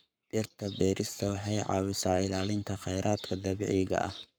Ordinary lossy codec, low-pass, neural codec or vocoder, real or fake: none; none; codec, 44.1 kHz, 7.8 kbps, Pupu-Codec; fake